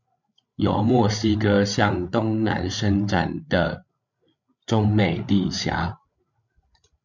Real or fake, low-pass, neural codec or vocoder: fake; 7.2 kHz; codec, 16 kHz, 8 kbps, FreqCodec, larger model